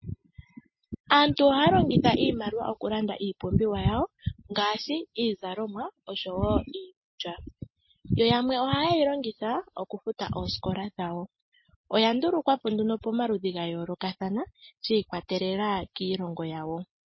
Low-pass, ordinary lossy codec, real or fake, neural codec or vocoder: 7.2 kHz; MP3, 24 kbps; real; none